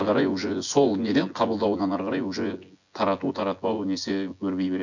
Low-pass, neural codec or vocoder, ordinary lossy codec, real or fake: 7.2 kHz; vocoder, 24 kHz, 100 mel bands, Vocos; none; fake